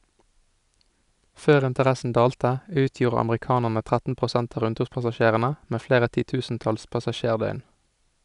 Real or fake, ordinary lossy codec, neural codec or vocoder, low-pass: real; none; none; 10.8 kHz